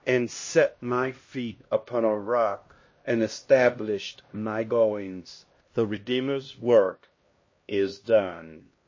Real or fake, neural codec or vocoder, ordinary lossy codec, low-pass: fake; codec, 16 kHz, 1 kbps, X-Codec, HuBERT features, trained on LibriSpeech; MP3, 32 kbps; 7.2 kHz